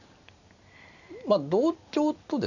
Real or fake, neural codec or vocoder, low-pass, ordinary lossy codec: real; none; 7.2 kHz; none